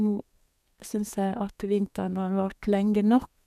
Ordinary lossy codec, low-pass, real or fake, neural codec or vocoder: none; 14.4 kHz; fake; codec, 32 kHz, 1.9 kbps, SNAC